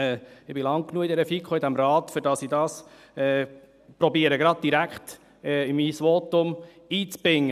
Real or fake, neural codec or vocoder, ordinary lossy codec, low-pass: real; none; none; 14.4 kHz